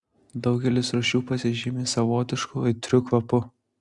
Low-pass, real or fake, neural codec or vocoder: 10.8 kHz; real; none